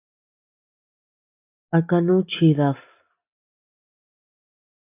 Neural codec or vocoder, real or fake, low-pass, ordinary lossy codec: none; real; 3.6 kHz; AAC, 24 kbps